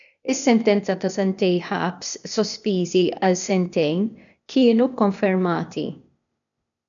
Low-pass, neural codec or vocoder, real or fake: 7.2 kHz; codec, 16 kHz, 0.8 kbps, ZipCodec; fake